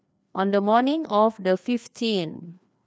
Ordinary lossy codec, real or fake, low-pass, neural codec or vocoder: none; fake; none; codec, 16 kHz, 2 kbps, FreqCodec, larger model